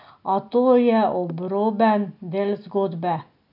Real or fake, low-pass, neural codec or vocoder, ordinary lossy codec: real; 5.4 kHz; none; none